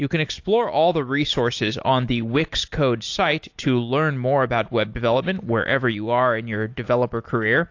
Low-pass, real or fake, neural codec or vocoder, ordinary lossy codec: 7.2 kHz; real; none; AAC, 48 kbps